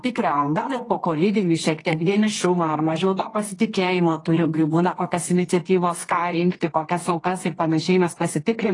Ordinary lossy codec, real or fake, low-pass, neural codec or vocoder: AAC, 32 kbps; fake; 10.8 kHz; codec, 24 kHz, 0.9 kbps, WavTokenizer, medium music audio release